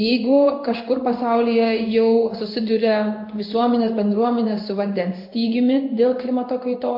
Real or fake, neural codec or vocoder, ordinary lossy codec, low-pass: fake; codec, 16 kHz in and 24 kHz out, 1 kbps, XY-Tokenizer; MP3, 32 kbps; 5.4 kHz